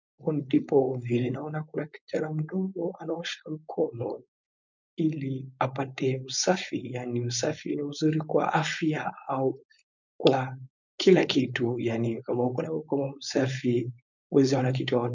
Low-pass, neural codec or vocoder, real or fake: 7.2 kHz; codec, 16 kHz, 4.8 kbps, FACodec; fake